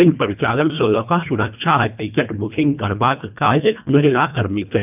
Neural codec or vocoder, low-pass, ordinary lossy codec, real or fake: codec, 24 kHz, 1.5 kbps, HILCodec; 3.6 kHz; none; fake